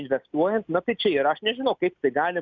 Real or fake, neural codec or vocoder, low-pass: real; none; 7.2 kHz